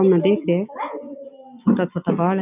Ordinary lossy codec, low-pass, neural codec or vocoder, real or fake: none; 3.6 kHz; none; real